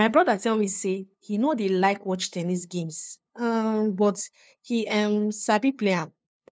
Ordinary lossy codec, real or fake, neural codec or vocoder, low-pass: none; fake; codec, 16 kHz, 2 kbps, FunCodec, trained on LibriTTS, 25 frames a second; none